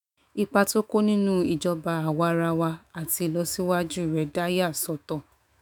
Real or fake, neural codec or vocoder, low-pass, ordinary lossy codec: fake; autoencoder, 48 kHz, 128 numbers a frame, DAC-VAE, trained on Japanese speech; none; none